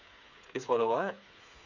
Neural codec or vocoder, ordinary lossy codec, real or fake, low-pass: codec, 16 kHz, 4 kbps, FreqCodec, smaller model; none; fake; 7.2 kHz